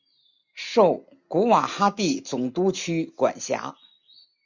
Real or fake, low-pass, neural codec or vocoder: real; 7.2 kHz; none